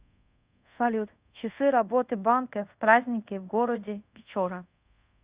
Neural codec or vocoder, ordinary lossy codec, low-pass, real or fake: codec, 24 kHz, 0.5 kbps, DualCodec; Opus, 64 kbps; 3.6 kHz; fake